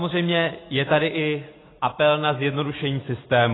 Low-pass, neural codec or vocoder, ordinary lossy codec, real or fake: 7.2 kHz; none; AAC, 16 kbps; real